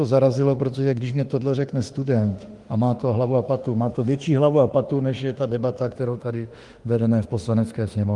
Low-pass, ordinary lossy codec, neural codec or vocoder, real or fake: 10.8 kHz; Opus, 24 kbps; autoencoder, 48 kHz, 32 numbers a frame, DAC-VAE, trained on Japanese speech; fake